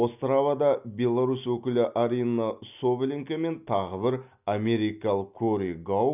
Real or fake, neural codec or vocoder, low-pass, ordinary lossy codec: real; none; 3.6 kHz; none